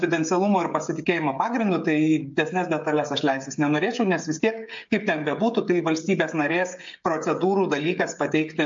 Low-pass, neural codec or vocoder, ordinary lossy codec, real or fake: 7.2 kHz; codec, 16 kHz, 16 kbps, FreqCodec, smaller model; MP3, 48 kbps; fake